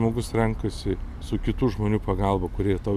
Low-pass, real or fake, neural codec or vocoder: 14.4 kHz; real; none